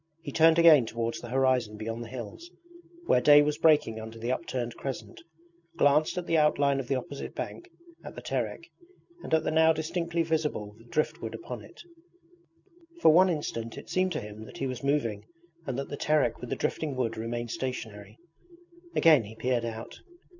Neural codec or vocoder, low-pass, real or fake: none; 7.2 kHz; real